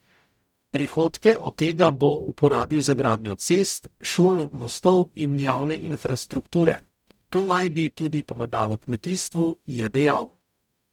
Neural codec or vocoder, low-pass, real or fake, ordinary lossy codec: codec, 44.1 kHz, 0.9 kbps, DAC; 19.8 kHz; fake; none